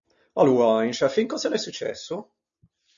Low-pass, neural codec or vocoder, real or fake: 7.2 kHz; none; real